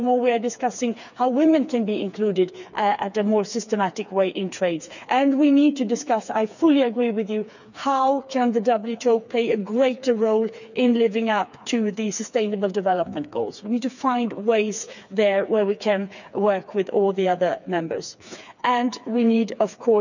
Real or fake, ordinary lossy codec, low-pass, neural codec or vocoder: fake; none; 7.2 kHz; codec, 16 kHz, 4 kbps, FreqCodec, smaller model